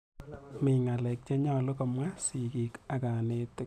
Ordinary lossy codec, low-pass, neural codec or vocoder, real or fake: none; 14.4 kHz; none; real